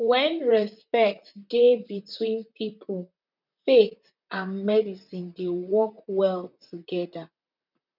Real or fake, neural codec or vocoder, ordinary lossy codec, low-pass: fake; vocoder, 44.1 kHz, 128 mel bands, Pupu-Vocoder; none; 5.4 kHz